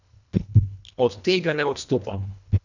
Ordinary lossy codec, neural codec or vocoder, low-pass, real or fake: none; codec, 24 kHz, 1.5 kbps, HILCodec; 7.2 kHz; fake